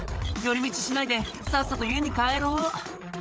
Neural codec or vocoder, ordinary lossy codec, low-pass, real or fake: codec, 16 kHz, 8 kbps, FreqCodec, larger model; none; none; fake